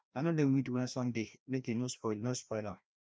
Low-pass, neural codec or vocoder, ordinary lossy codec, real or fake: none; codec, 16 kHz, 1 kbps, FreqCodec, larger model; none; fake